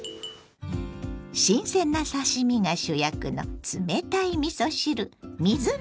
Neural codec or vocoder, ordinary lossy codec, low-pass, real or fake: none; none; none; real